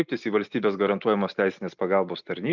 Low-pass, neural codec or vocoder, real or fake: 7.2 kHz; none; real